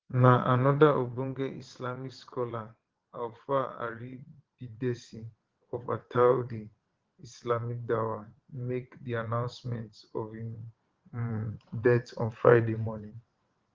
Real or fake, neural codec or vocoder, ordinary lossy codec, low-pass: fake; vocoder, 22.05 kHz, 80 mel bands, WaveNeXt; Opus, 16 kbps; 7.2 kHz